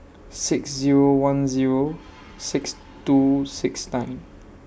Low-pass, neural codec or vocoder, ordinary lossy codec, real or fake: none; none; none; real